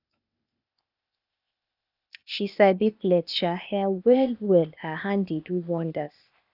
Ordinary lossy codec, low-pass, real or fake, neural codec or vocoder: none; 5.4 kHz; fake; codec, 16 kHz, 0.8 kbps, ZipCodec